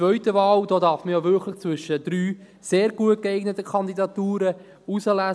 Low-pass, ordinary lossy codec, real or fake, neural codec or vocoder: none; none; real; none